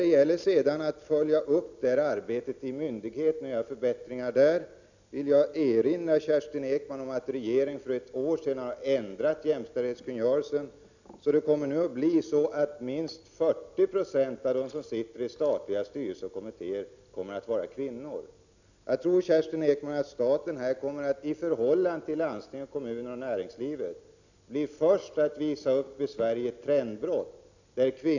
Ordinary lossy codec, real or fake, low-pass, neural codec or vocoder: none; real; 7.2 kHz; none